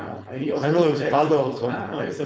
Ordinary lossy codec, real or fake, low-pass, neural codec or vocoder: none; fake; none; codec, 16 kHz, 4.8 kbps, FACodec